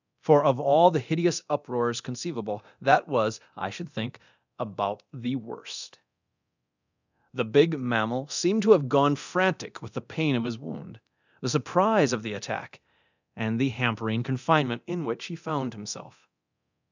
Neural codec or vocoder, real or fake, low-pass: codec, 24 kHz, 0.9 kbps, DualCodec; fake; 7.2 kHz